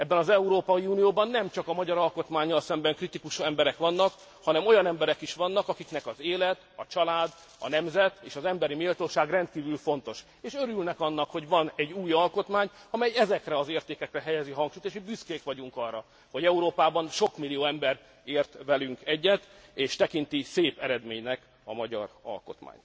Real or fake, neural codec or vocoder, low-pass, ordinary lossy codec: real; none; none; none